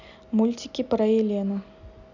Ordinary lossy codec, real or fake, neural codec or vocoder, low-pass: none; real; none; 7.2 kHz